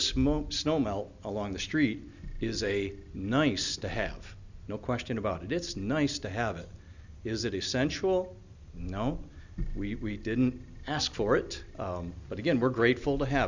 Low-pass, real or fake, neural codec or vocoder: 7.2 kHz; real; none